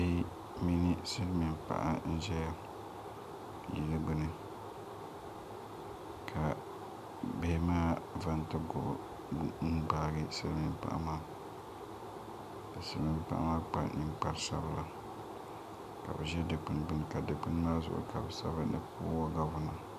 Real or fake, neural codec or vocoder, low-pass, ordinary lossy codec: real; none; 14.4 kHz; AAC, 96 kbps